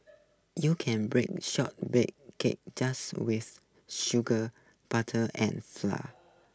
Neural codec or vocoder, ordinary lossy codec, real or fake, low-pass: none; none; real; none